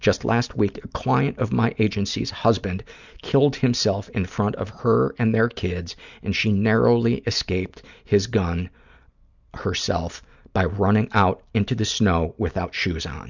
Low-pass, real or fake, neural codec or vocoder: 7.2 kHz; real; none